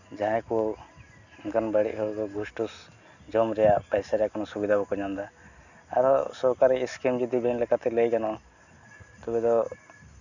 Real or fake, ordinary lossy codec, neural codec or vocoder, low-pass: real; none; none; 7.2 kHz